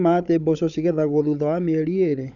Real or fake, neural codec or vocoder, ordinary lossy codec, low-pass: fake; codec, 16 kHz, 16 kbps, FunCodec, trained on Chinese and English, 50 frames a second; none; 7.2 kHz